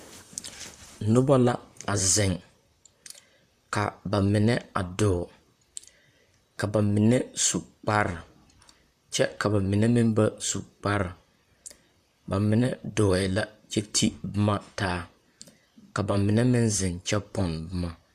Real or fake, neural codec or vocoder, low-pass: fake; vocoder, 44.1 kHz, 128 mel bands, Pupu-Vocoder; 14.4 kHz